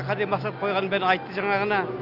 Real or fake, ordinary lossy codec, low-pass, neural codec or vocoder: real; none; 5.4 kHz; none